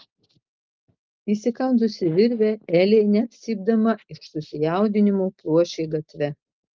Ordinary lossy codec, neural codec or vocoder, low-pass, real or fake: Opus, 32 kbps; none; 7.2 kHz; real